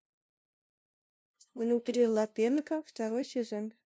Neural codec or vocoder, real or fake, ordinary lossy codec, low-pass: codec, 16 kHz, 0.5 kbps, FunCodec, trained on LibriTTS, 25 frames a second; fake; none; none